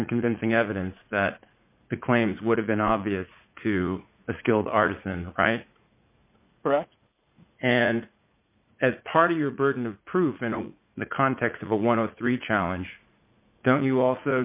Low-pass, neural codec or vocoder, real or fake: 3.6 kHz; vocoder, 44.1 kHz, 80 mel bands, Vocos; fake